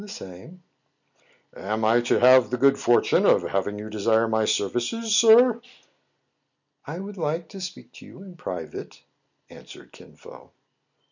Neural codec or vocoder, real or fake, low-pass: none; real; 7.2 kHz